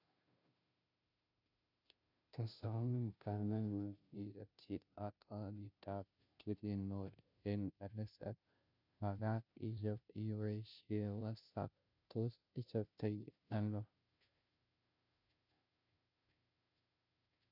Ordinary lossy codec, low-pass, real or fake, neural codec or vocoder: none; 5.4 kHz; fake; codec, 16 kHz, 0.5 kbps, FunCodec, trained on Chinese and English, 25 frames a second